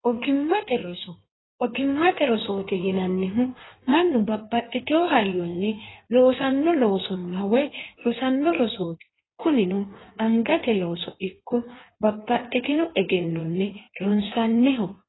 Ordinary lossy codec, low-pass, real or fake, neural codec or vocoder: AAC, 16 kbps; 7.2 kHz; fake; codec, 16 kHz in and 24 kHz out, 1.1 kbps, FireRedTTS-2 codec